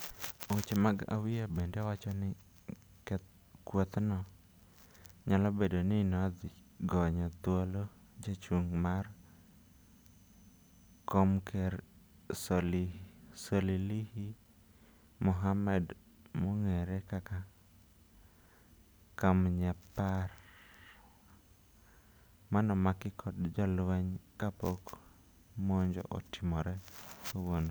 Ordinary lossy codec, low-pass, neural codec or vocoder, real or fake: none; none; none; real